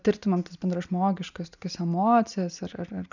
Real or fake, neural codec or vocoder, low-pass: real; none; 7.2 kHz